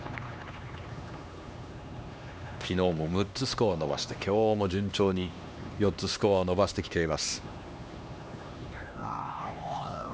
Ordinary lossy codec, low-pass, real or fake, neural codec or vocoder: none; none; fake; codec, 16 kHz, 2 kbps, X-Codec, HuBERT features, trained on LibriSpeech